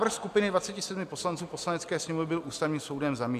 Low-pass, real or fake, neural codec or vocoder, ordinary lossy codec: 14.4 kHz; real; none; MP3, 96 kbps